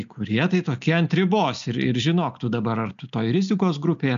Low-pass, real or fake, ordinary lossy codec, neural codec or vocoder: 7.2 kHz; real; MP3, 64 kbps; none